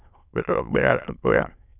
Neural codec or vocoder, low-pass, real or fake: autoencoder, 22.05 kHz, a latent of 192 numbers a frame, VITS, trained on many speakers; 3.6 kHz; fake